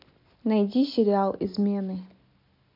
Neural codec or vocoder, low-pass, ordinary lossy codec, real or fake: none; 5.4 kHz; AAC, 32 kbps; real